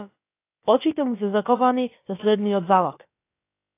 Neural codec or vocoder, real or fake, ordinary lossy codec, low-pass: codec, 16 kHz, about 1 kbps, DyCAST, with the encoder's durations; fake; AAC, 24 kbps; 3.6 kHz